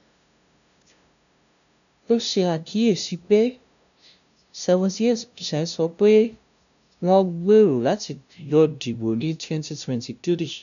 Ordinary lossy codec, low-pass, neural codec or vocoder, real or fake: none; 7.2 kHz; codec, 16 kHz, 0.5 kbps, FunCodec, trained on LibriTTS, 25 frames a second; fake